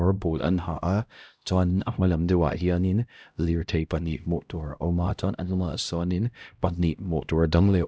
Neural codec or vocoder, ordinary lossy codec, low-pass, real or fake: codec, 16 kHz, 0.5 kbps, X-Codec, HuBERT features, trained on LibriSpeech; none; none; fake